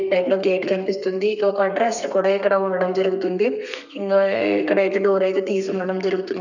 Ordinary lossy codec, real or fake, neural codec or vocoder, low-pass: none; fake; codec, 32 kHz, 1.9 kbps, SNAC; 7.2 kHz